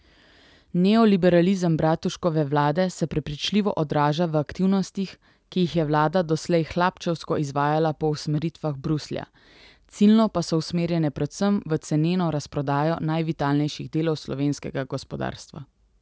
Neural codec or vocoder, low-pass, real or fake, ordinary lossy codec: none; none; real; none